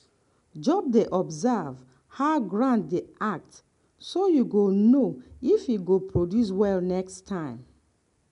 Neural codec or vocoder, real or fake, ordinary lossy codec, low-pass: none; real; none; 10.8 kHz